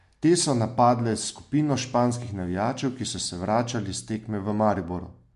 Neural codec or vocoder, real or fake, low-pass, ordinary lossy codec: none; real; 10.8 kHz; MP3, 64 kbps